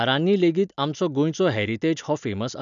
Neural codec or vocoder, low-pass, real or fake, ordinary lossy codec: none; 7.2 kHz; real; none